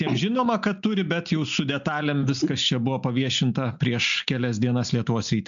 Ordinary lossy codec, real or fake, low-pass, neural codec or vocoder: MP3, 64 kbps; real; 7.2 kHz; none